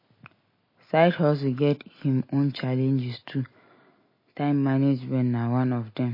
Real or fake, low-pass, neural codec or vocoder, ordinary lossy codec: real; 5.4 kHz; none; MP3, 24 kbps